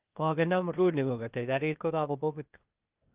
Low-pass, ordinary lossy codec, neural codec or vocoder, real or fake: 3.6 kHz; Opus, 32 kbps; codec, 16 kHz, 0.8 kbps, ZipCodec; fake